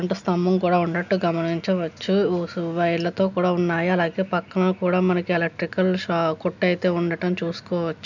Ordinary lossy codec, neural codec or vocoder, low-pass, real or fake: none; none; 7.2 kHz; real